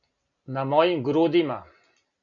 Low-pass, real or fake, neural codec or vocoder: 7.2 kHz; real; none